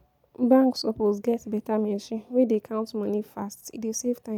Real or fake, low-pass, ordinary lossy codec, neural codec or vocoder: real; 19.8 kHz; none; none